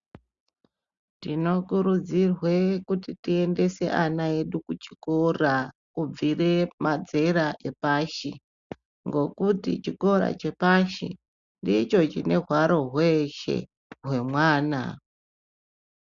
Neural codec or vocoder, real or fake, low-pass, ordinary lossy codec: none; real; 7.2 kHz; Opus, 64 kbps